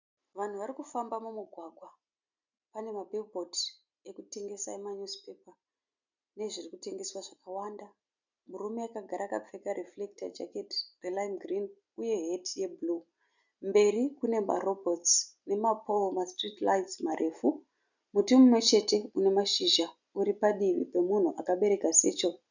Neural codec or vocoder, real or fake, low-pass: none; real; 7.2 kHz